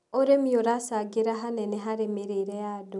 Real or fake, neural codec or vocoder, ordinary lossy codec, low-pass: real; none; none; 10.8 kHz